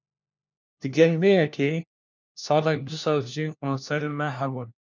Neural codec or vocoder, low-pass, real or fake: codec, 16 kHz, 1 kbps, FunCodec, trained on LibriTTS, 50 frames a second; 7.2 kHz; fake